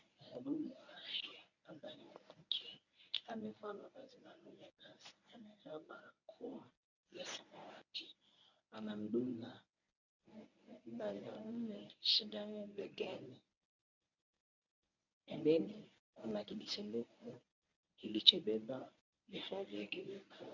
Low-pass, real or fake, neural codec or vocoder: 7.2 kHz; fake; codec, 24 kHz, 0.9 kbps, WavTokenizer, medium speech release version 1